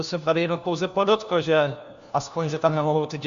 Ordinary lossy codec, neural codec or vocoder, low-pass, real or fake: Opus, 64 kbps; codec, 16 kHz, 1 kbps, FunCodec, trained on LibriTTS, 50 frames a second; 7.2 kHz; fake